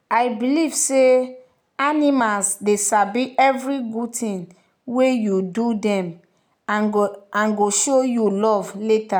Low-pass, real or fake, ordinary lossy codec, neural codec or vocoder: none; real; none; none